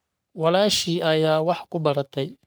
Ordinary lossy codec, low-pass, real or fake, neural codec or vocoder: none; none; fake; codec, 44.1 kHz, 3.4 kbps, Pupu-Codec